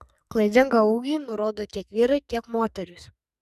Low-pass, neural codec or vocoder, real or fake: 14.4 kHz; codec, 44.1 kHz, 2.6 kbps, SNAC; fake